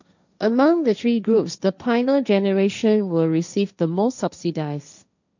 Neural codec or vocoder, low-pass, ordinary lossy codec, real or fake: codec, 16 kHz, 1.1 kbps, Voila-Tokenizer; 7.2 kHz; none; fake